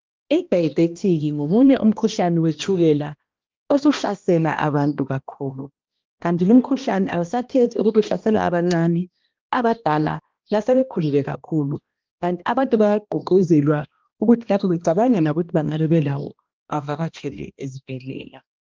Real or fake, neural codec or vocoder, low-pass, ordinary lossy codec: fake; codec, 16 kHz, 1 kbps, X-Codec, HuBERT features, trained on balanced general audio; 7.2 kHz; Opus, 24 kbps